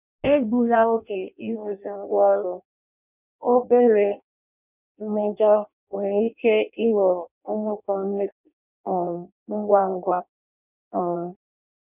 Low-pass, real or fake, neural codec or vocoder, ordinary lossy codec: 3.6 kHz; fake; codec, 16 kHz in and 24 kHz out, 0.6 kbps, FireRedTTS-2 codec; none